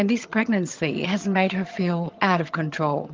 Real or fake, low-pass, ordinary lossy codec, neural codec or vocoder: fake; 7.2 kHz; Opus, 32 kbps; vocoder, 22.05 kHz, 80 mel bands, HiFi-GAN